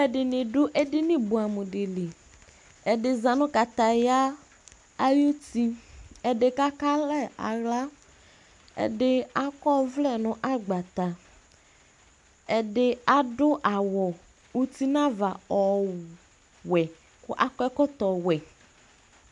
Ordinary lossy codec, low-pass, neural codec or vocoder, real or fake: MP3, 64 kbps; 10.8 kHz; none; real